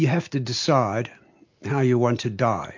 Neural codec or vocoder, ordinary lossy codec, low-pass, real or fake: none; MP3, 48 kbps; 7.2 kHz; real